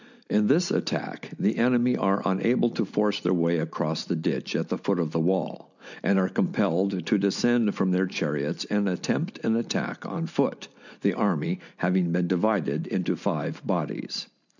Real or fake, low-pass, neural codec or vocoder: real; 7.2 kHz; none